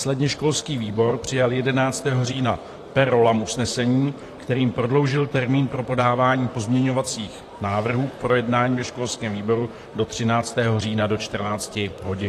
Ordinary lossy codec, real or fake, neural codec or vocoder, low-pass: AAC, 48 kbps; fake; vocoder, 44.1 kHz, 128 mel bands, Pupu-Vocoder; 14.4 kHz